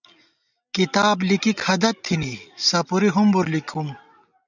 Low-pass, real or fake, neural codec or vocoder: 7.2 kHz; real; none